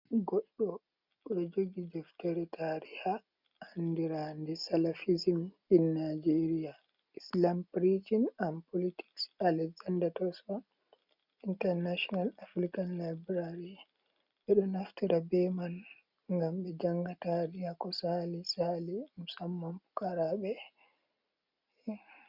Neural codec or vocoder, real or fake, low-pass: none; real; 5.4 kHz